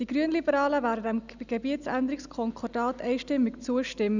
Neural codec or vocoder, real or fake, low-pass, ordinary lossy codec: none; real; 7.2 kHz; none